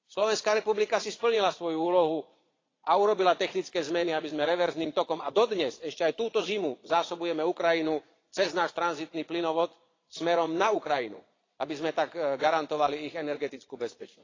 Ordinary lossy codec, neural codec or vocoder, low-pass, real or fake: AAC, 32 kbps; vocoder, 22.05 kHz, 80 mel bands, Vocos; 7.2 kHz; fake